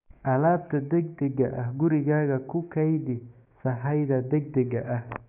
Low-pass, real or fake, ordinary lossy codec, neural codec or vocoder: 3.6 kHz; fake; none; codec, 16 kHz, 6 kbps, DAC